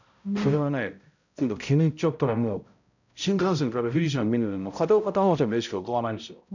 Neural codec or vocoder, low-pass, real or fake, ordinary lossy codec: codec, 16 kHz, 0.5 kbps, X-Codec, HuBERT features, trained on balanced general audio; 7.2 kHz; fake; none